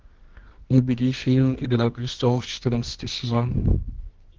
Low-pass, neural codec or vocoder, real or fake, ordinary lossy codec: 7.2 kHz; codec, 24 kHz, 0.9 kbps, WavTokenizer, medium music audio release; fake; Opus, 16 kbps